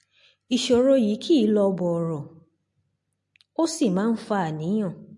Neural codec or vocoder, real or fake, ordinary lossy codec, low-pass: none; real; MP3, 48 kbps; 10.8 kHz